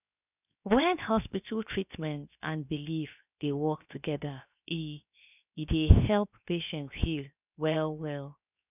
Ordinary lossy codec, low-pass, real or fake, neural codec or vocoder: none; 3.6 kHz; fake; codec, 16 kHz, 0.7 kbps, FocalCodec